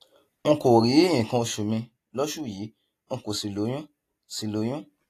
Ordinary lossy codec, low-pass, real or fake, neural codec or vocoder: AAC, 48 kbps; 14.4 kHz; real; none